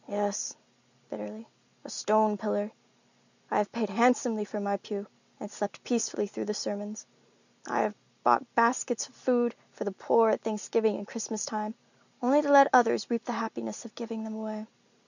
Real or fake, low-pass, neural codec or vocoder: real; 7.2 kHz; none